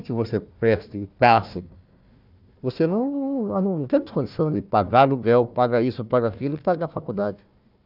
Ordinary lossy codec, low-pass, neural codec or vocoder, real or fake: none; 5.4 kHz; codec, 16 kHz, 1 kbps, FunCodec, trained on Chinese and English, 50 frames a second; fake